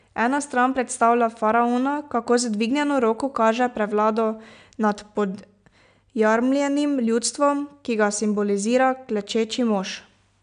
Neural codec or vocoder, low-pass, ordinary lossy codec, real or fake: none; 9.9 kHz; none; real